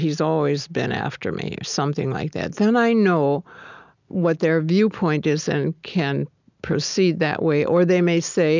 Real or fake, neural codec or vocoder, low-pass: real; none; 7.2 kHz